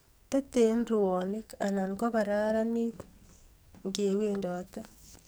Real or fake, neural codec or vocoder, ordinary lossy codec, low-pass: fake; codec, 44.1 kHz, 2.6 kbps, SNAC; none; none